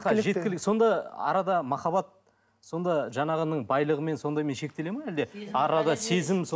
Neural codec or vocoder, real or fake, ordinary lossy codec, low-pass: none; real; none; none